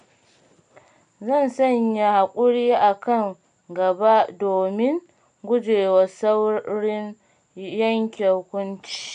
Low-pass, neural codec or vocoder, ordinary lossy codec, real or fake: 9.9 kHz; none; none; real